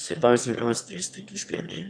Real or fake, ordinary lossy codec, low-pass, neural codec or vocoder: fake; none; 9.9 kHz; autoencoder, 22.05 kHz, a latent of 192 numbers a frame, VITS, trained on one speaker